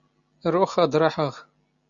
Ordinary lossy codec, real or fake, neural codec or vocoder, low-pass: Opus, 64 kbps; real; none; 7.2 kHz